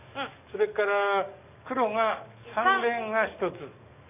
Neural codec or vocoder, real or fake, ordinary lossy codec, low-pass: codec, 44.1 kHz, 7.8 kbps, DAC; fake; none; 3.6 kHz